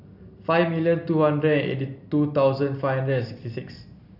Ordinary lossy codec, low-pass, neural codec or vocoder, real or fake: none; 5.4 kHz; none; real